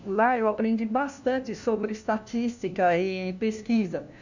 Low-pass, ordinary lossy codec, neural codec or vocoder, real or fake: 7.2 kHz; none; codec, 16 kHz, 1 kbps, FunCodec, trained on LibriTTS, 50 frames a second; fake